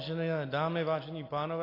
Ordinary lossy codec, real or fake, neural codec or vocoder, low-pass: AAC, 24 kbps; real; none; 5.4 kHz